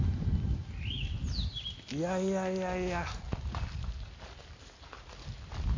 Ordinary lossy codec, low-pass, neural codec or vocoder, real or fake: MP3, 48 kbps; 7.2 kHz; none; real